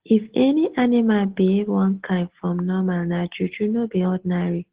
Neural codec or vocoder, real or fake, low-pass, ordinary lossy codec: none; real; 3.6 kHz; Opus, 16 kbps